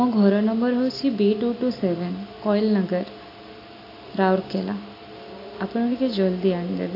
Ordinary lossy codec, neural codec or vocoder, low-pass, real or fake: AAC, 32 kbps; none; 5.4 kHz; real